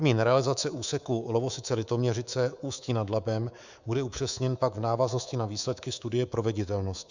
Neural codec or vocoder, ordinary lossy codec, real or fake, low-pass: codec, 24 kHz, 3.1 kbps, DualCodec; Opus, 64 kbps; fake; 7.2 kHz